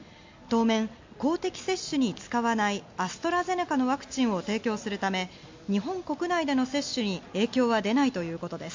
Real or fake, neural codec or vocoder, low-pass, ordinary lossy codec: real; none; 7.2 kHz; MP3, 48 kbps